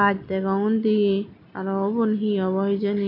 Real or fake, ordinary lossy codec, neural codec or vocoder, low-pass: real; none; none; 5.4 kHz